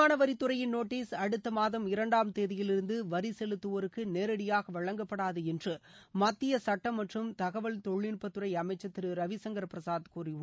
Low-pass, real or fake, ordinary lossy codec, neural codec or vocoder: none; real; none; none